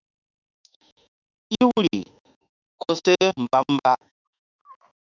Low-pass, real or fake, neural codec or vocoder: 7.2 kHz; fake; autoencoder, 48 kHz, 32 numbers a frame, DAC-VAE, trained on Japanese speech